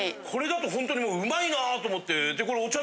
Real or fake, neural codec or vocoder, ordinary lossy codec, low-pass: real; none; none; none